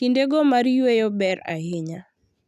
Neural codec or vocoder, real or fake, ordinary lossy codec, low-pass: none; real; none; 14.4 kHz